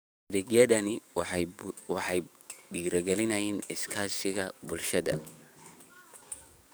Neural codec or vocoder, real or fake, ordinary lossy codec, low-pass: codec, 44.1 kHz, 7.8 kbps, DAC; fake; none; none